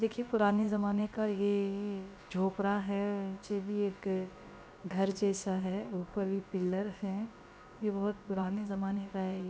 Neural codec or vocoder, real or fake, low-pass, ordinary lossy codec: codec, 16 kHz, about 1 kbps, DyCAST, with the encoder's durations; fake; none; none